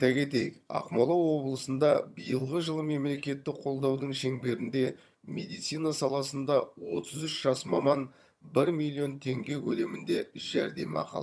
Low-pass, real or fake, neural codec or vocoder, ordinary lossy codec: none; fake; vocoder, 22.05 kHz, 80 mel bands, HiFi-GAN; none